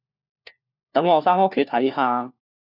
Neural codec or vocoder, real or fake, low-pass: codec, 16 kHz, 1 kbps, FunCodec, trained on LibriTTS, 50 frames a second; fake; 5.4 kHz